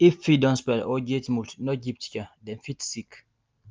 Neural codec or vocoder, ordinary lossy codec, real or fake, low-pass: none; Opus, 24 kbps; real; 7.2 kHz